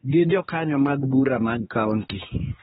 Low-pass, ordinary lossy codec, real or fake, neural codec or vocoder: 7.2 kHz; AAC, 16 kbps; fake; codec, 16 kHz, 2 kbps, X-Codec, HuBERT features, trained on general audio